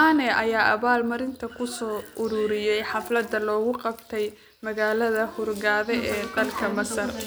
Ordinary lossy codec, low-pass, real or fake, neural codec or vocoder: none; none; real; none